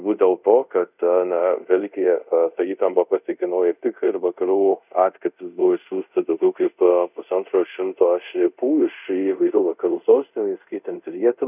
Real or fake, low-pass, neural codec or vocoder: fake; 3.6 kHz; codec, 24 kHz, 0.5 kbps, DualCodec